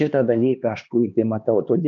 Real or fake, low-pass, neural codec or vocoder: fake; 7.2 kHz; codec, 16 kHz, 2 kbps, X-Codec, HuBERT features, trained on LibriSpeech